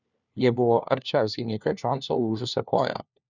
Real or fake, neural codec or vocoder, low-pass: fake; codec, 16 kHz, 1 kbps, FunCodec, trained on LibriTTS, 50 frames a second; 7.2 kHz